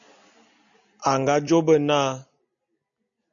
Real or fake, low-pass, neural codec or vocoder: real; 7.2 kHz; none